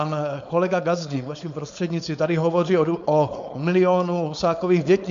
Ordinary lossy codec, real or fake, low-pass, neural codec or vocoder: MP3, 64 kbps; fake; 7.2 kHz; codec, 16 kHz, 4.8 kbps, FACodec